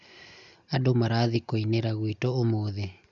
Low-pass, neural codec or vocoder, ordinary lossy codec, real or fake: 7.2 kHz; none; none; real